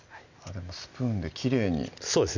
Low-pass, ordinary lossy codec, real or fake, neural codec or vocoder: 7.2 kHz; none; real; none